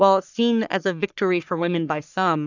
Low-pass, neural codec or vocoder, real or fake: 7.2 kHz; codec, 44.1 kHz, 3.4 kbps, Pupu-Codec; fake